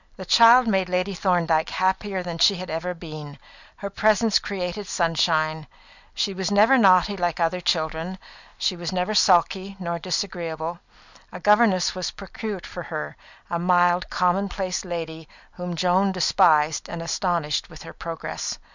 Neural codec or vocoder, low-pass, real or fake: none; 7.2 kHz; real